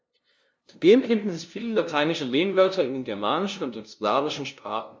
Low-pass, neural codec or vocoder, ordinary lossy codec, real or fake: none; codec, 16 kHz, 0.5 kbps, FunCodec, trained on LibriTTS, 25 frames a second; none; fake